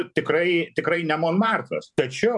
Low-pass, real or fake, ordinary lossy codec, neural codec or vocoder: 10.8 kHz; fake; MP3, 96 kbps; vocoder, 44.1 kHz, 128 mel bands every 512 samples, BigVGAN v2